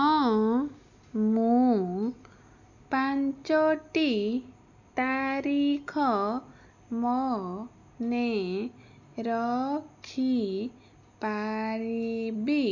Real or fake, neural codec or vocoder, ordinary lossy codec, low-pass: real; none; none; 7.2 kHz